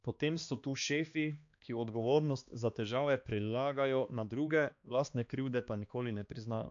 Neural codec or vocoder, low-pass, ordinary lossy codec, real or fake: codec, 16 kHz, 2 kbps, X-Codec, HuBERT features, trained on balanced general audio; 7.2 kHz; AAC, 64 kbps; fake